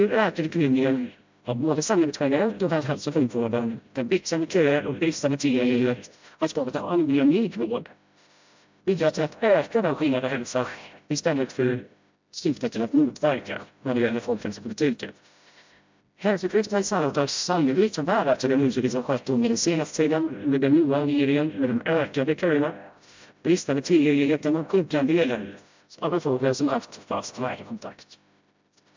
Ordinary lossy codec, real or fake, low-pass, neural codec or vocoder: MP3, 64 kbps; fake; 7.2 kHz; codec, 16 kHz, 0.5 kbps, FreqCodec, smaller model